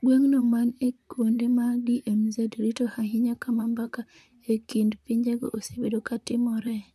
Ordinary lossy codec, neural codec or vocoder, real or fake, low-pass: none; vocoder, 44.1 kHz, 128 mel bands, Pupu-Vocoder; fake; 14.4 kHz